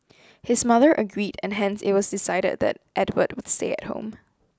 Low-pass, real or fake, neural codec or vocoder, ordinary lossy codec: none; real; none; none